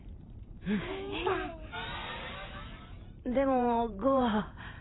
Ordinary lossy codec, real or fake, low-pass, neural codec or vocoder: AAC, 16 kbps; fake; 7.2 kHz; vocoder, 44.1 kHz, 128 mel bands every 512 samples, BigVGAN v2